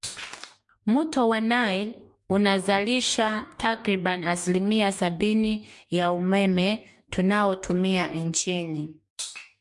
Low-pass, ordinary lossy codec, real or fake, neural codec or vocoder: 10.8 kHz; MP3, 64 kbps; fake; codec, 44.1 kHz, 2.6 kbps, DAC